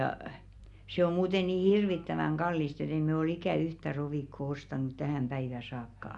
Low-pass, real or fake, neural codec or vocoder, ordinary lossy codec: 10.8 kHz; real; none; none